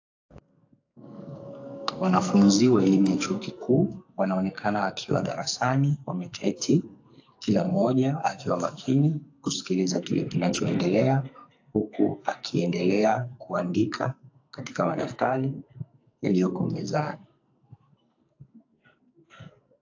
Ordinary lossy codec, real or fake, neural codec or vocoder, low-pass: AAC, 48 kbps; fake; codec, 44.1 kHz, 2.6 kbps, SNAC; 7.2 kHz